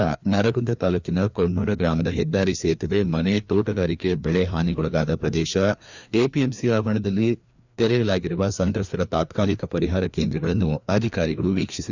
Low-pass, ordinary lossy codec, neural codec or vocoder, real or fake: 7.2 kHz; none; codec, 16 kHz, 2 kbps, FreqCodec, larger model; fake